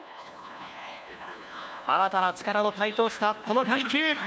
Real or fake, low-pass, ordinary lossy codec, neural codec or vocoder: fake; none; none; codec, 16 kHz, 1 kbps, FunCodec, trained on LibriTTS, 50 frames a second